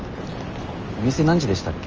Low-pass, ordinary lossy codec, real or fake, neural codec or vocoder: 7.2 kHz; Opus, 24 kbps; real; none